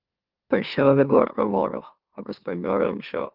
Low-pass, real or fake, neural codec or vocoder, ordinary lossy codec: 5.4 kHz; fake; autoencoder, 44.1 kHz, a latent of 192 numbers a frame, MeloTTS; Opus, 32 kbps